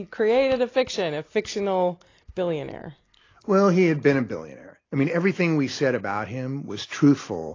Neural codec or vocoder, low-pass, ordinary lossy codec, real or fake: none; 7.2 kHz; AAC, 32 kbps; real